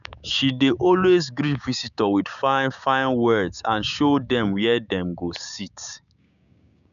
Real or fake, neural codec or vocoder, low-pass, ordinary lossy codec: fake; codec, 16 kHz, 6 kbps, DAC; 7.2 kHz; none